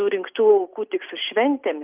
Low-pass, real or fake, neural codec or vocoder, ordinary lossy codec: 3.6 kHz; real; none; Opus, 24 kbps